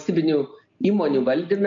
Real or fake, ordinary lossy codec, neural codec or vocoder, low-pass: real; AAC, 48 kbps; none; 7.2 kHz